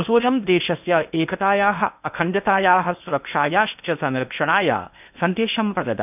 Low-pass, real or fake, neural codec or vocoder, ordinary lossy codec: 3.6 kHz; fake; codec, 16 kHz in and 24 kHz out, 0.8 kbps, FocalCodec, streaming, 65536 codes; none